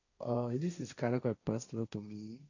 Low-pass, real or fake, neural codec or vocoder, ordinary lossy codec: 7.2 kHz; fake; codec, 16 kHz, 1.1 kbps, Voila-Tokenizer; none